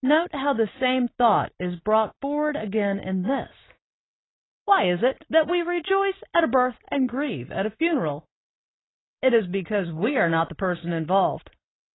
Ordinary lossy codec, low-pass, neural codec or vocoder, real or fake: AAC, 16 kbps; 7.2 kHz; none; real